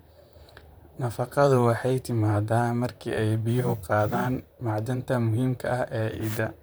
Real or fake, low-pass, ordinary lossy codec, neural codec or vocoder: fake; none; none; vocoder, 44.1 kHz, 128 mel bands, Pupu-Vocoder